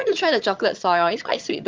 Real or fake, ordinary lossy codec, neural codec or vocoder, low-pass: fake; Opus, 24 kbps; vocoder, 22.05 kHz, 80 mel bands, HiFi-GAN; 7.2 kHz